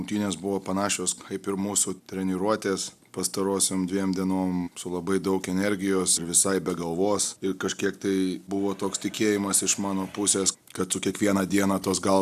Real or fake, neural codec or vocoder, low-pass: real; none; 14.4 kHz